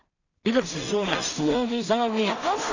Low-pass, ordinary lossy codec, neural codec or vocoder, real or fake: 7.2 kHz; none; codec, 16 kHz in and 24 kHz out, 0.4 kbps, LongCat-Audio-Codec, two codebook decoder; fake